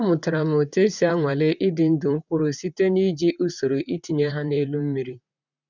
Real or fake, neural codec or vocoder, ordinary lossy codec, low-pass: fake; vocoder, 44.1 kHz, 128 mel bands, Pupu-Vocoder; none; 7.2 kHz